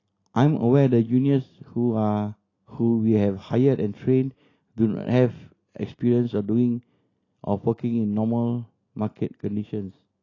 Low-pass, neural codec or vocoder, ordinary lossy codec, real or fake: 7.2 kHz; none; AAC, 32 kbps; real